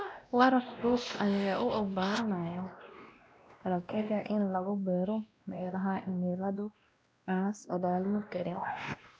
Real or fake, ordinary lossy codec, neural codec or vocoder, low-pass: fake; none; codec, 16 kHz, 1 kbps, X-Codec, WavLM features, trained on Multilingual LibriSpeech; none